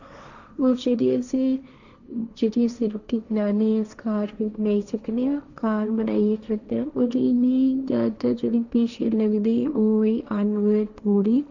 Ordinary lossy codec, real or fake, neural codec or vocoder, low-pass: none; fake; codec, 16 kHz, 1.1 kbps, Voila-Tokenizer; 7.2 kHz